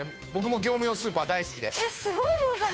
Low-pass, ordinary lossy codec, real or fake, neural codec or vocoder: none; none; fake; codec, 16 kHz, 2 kbps, FunCodec, trained on Chinese and English, 25 frames a second